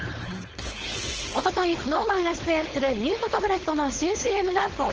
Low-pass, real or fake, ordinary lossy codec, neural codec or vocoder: 7.2 kHz; fake; Opus, 16 kbps; codec, 16 kHz, 4.8 kbps, FACodec